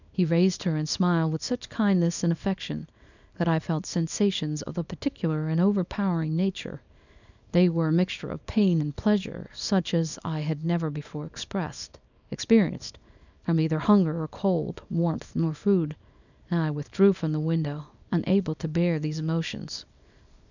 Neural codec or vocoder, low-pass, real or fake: codec, 24 kHz, 0.9 kbps, WavTokenizer, small release; 7.2 kHz; fake